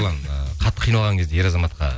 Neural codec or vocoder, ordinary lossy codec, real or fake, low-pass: none; none; real; none